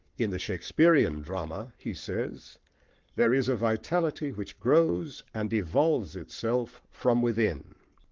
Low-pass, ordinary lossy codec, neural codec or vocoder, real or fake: 7.2 kHz; Opus, 32 kbps; codec, 44.1 kHz, 7.8 kbps, Pupu-Codec; fake